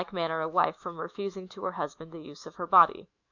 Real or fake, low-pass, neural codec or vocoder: fake; 7.2 kHz; autoencoder, 48 kHz, 128 numbers a frame, DAC-VAE, trained on Japanese speech